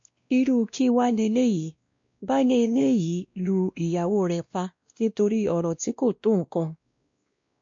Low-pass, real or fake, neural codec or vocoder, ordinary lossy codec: 7.2 kHz; fake; codec, 16 kHz, 1 kbps, X-Codec, WavLM features, trained on Multilingual LibriSpeech; MP3, 48 kbps